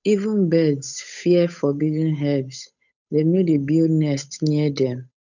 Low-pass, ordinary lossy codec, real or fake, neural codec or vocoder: 7.2 kHz; none; fake; codec, 16 kHz, 8 kbps, FunCodec, trained on Chinese and English, 25 frames a second